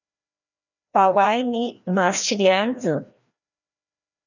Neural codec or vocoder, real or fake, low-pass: codec, 16 kHz, 1 kbps, FreqCodec, larger model; fake; 7.2 kHz